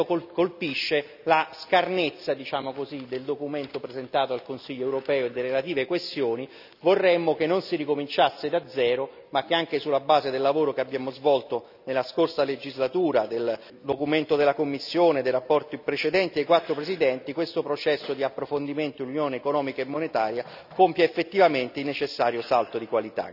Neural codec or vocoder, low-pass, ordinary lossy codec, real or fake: none; 5.4 kHz; none; real